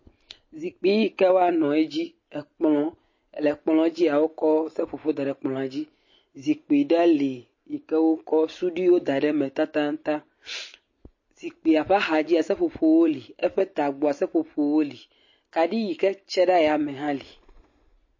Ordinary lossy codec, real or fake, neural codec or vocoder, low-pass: MP3, 32 kbps; real; none; 7.2 kHz